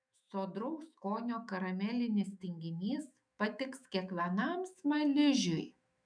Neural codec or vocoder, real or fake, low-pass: autoencoder, 48 kHz, 128 numbers a frame, DAC-VAE, trained on Japanese speech; fake; 9.9 kHz